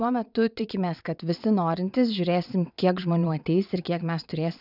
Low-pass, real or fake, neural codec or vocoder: 5.4 kHz; fake; vocoder, 22.05 kHz, 80 mel bands, WaveNeXt